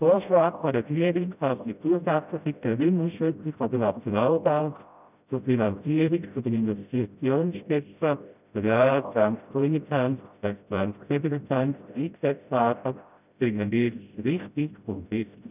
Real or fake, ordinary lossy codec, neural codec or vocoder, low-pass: fake; none; codec, 16 kHz, 0.5 kbps, FreqCodec, smaller model; 3.6 kHz